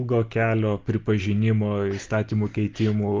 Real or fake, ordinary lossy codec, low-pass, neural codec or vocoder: real; Opus, 32 kbps; 7.2 kHz; none